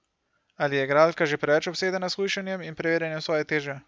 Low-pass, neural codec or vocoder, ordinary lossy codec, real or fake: none; none; none; real